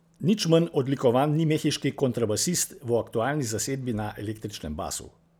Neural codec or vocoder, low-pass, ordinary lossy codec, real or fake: none; none; none; real